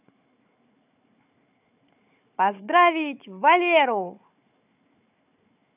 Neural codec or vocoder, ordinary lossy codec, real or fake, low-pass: codec, 16 kHz, 4 kbps, FunCodec, trained on Chinese and English, 50 frames a second; none; fake; 3.6 kHz